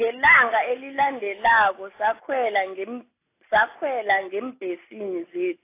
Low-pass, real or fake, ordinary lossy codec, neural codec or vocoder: 3.6 kHz; real; AAC, 24 kbps; none